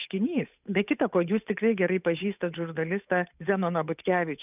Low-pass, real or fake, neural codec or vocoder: 3.6 kHz; fake; codec, 16 kHz, 8 kbps, FunCodec, trained on Chinese and English, 25 frames a second